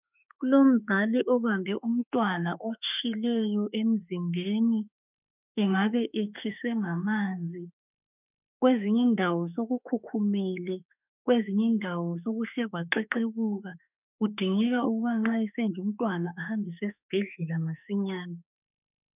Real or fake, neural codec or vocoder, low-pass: fake; autoencoder, 48 kHz, 32 numbers a frame, DAC-VAE, trained on Japanese speech; 3.6 kHz